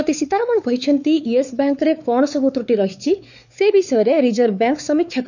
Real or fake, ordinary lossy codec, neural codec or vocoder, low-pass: fake; none; codec, 16 kHz, 4 kbps, X-Codec, WavLM features, trained on Multilingual LibriSpeech; 7.2 kHz